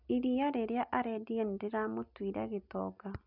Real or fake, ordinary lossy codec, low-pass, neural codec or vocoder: real; none; 5.4 kHz; none